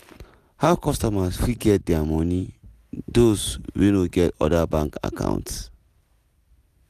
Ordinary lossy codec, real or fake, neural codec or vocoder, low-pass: none; real; none; 14.4 kHz